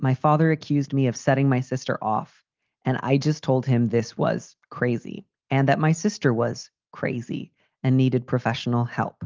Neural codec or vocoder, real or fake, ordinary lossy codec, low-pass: none; real; Opus, 32 kbps; 7.2 kHz